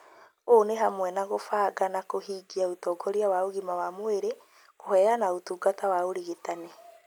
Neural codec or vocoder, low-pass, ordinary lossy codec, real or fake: none; none; none; real